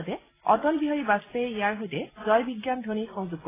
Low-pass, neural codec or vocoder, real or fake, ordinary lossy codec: 3.6 kHz; none; real; AAC, 16 kbps